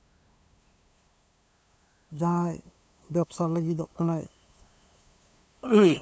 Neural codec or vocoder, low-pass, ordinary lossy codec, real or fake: codec, 16 kHz, 2 kbps, FunCodec, trained on LibriTTS, 25 frames a second; none; none; fake